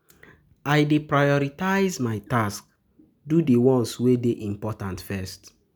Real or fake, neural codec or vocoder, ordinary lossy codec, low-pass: real; none; none; none